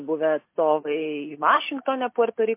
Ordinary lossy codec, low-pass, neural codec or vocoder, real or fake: MP3, 24 kbps; 3.6 kHz; none; real